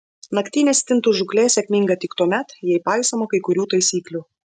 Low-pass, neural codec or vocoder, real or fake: 10.8 kHz; none; real